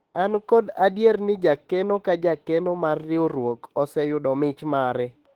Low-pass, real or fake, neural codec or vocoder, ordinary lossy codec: 19.8 kHz; fake; autoencoder, 48 kHz, 32 numbers a frame, DAC-VAE, trained on Japanese speech; Opus, 16 kbps